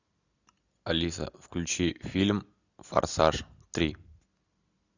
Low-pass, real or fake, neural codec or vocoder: 7.2 kHz; real; none